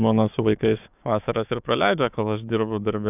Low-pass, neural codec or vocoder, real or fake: 3.6 kHz; codec, 16 kHz, 6 kbps, DAC; fake